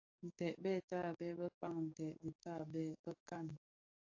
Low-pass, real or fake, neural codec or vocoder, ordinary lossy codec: 7.2 kHz; fake; codec, 44.1 kHz, 7.8 kbps, DAC; AAC, 48 kbps